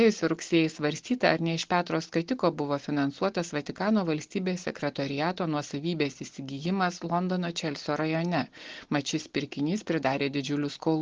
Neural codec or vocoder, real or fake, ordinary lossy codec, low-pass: none; real; Opus, 16 kbps; 7.2 kHz